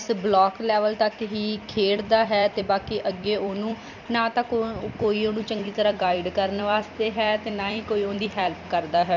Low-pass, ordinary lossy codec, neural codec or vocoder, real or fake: 7.2 kHz; none; vocoder, 44.1 kHz, 128 mel bands every 256 samples, BigVGAN v2; fake